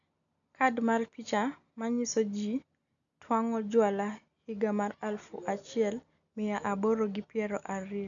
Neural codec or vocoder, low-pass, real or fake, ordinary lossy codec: none; 7.2 kHz; real; none